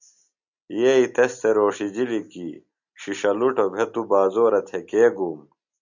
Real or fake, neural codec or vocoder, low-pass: real; none; 7.2 kHz